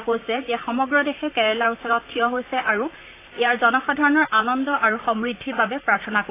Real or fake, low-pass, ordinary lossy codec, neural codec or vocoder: fake; 3.6 kHz; AAC, 24 kbps; vocoder, 44.1 kHz, 128 mel bands, Pupu-Vocoder